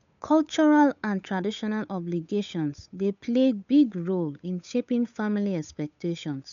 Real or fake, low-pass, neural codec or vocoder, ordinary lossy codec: fake; 7.2 kHz; codec, 16 kHz, 8 kbps, FunCodec, trained on Chinese and English, 25 frames a second; none